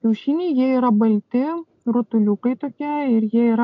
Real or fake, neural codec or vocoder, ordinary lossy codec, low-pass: real; none; MP3, 64 kbps; 7.2 kHz